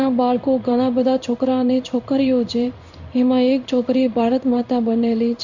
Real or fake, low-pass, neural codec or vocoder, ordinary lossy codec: fake; 7.2 kHz; codec, 16 kHz in and 24 kHz out, 1 kbps, XY-Tokenizer; none